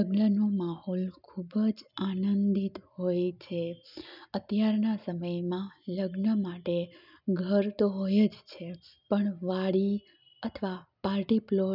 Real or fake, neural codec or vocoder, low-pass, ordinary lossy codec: real; none; 5.4 kHz; none